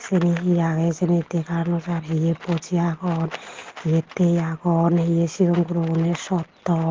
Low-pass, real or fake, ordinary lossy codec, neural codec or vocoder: 7.2 kHz; real; Opus, 16 kbps; none